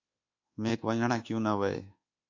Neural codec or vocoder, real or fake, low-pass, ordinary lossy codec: codec, 24 kHz, 1.2 kbps, DualCodec; fake; 7.2 kHz; AAC, 48 kbps